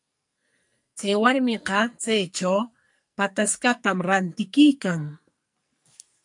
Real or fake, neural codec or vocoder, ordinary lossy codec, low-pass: fake; codec, 44.1 kHz, 2.6 kbps, SNAC; MP3, 64 kbps; 10.8 kHz